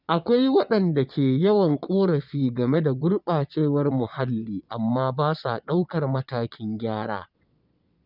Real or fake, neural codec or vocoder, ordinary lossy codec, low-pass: fake; vocoder, 22.05 kHz, 80 mel bands, Vocos; none; 5.4 kHz